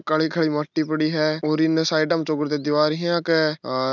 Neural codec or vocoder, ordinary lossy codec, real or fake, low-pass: none; none; real; 7.2 kHz